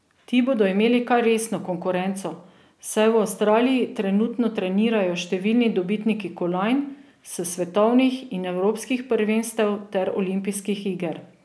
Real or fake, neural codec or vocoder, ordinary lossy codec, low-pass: real; none; none; none